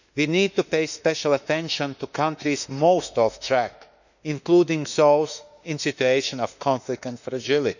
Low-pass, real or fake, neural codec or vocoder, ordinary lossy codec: 7.2 kHz; fake; autoencoder, 48 kHz, 32 numbers a frame, DAC-VAE, trained on Japanese speech; none